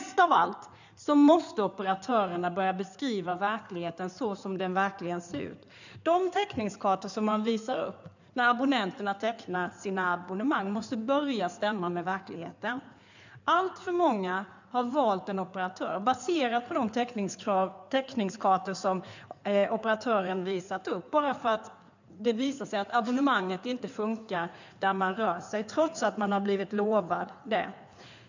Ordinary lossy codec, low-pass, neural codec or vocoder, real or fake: none; 7.2 kHz; codec, 16 kHz in and 24 kHz out, 2.2 kbps, FireRedTTS-2 codec; fake